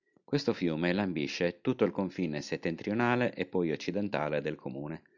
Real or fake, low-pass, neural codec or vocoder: real; 7.2 kHz; none